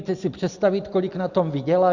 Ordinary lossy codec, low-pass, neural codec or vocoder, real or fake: Opus, 64 kbps; 7.2 kHz; none; real